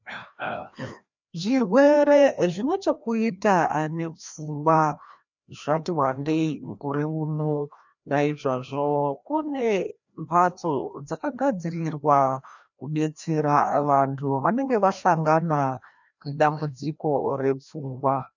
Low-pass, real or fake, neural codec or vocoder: 7.2 kHz; fake; codec, 16 kHz, 1 kbps, FreqCodec, larger model